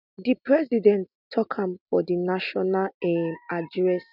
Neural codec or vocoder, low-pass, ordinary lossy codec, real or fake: none; 5.4 kHz; none; real